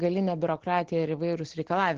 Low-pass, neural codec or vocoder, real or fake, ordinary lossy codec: 7.2 kHz; none; real; Opus, 16 kbps